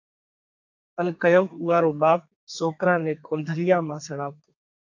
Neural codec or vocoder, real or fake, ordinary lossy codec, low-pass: codec, 32 kHz, 1.9 kbps, SNAC; fake; AAC, 48 kbps; 7.2 kHz